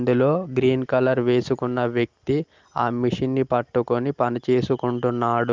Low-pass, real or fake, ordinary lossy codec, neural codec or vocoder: 7.2 kHz; real; Opus, 32 kbps; none